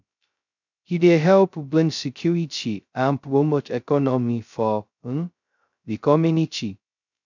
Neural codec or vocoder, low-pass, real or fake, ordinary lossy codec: codec, 16 kHz, 0.2 kbps, FocalCodec; 7.2 kHz; fake; none